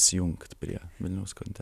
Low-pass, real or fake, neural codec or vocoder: 14.4 kHz; fake; vocoder, 44.1 kHz, 128 mel bands every 256 samples, BigVGAN v2